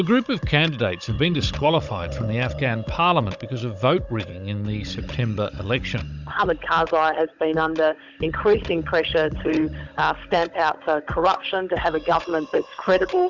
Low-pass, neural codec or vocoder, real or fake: 7.2 kHz; codec, 16 kHz, 16 kbps, FunCodec, trained on Chinese and English, 50 frames a second; fake